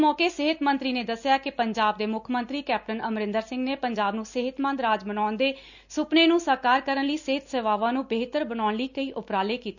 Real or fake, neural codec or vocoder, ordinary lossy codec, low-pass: real; none; none; 7.2 kHz